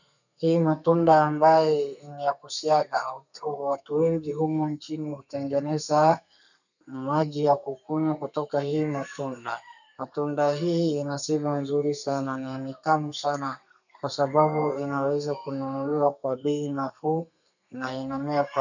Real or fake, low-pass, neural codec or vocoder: fake; 7.2 kHz; codec, 32 kHz, 1.9 kbps, SNAC